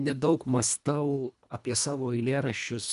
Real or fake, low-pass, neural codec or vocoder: fake; 10.8 kHz; codec, 24 kHz, 1.5 kbps, HILCodec